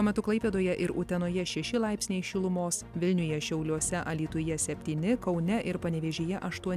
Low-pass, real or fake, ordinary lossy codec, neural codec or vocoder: 14.4 kHz; real; AAC, 96 kbps; none